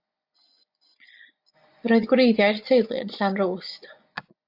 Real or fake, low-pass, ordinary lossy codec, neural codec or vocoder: fake; 5.4 kHz; AAC, 48 kbps; vocoder, 24 kHz, 100 mel bands, Vocos